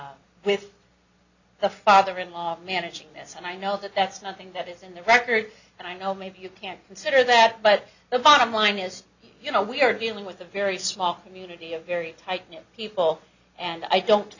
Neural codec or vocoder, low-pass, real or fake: none; 7.2 kHz; real